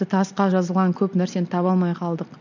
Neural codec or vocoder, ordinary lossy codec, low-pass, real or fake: none; none; 7.2 kHz; real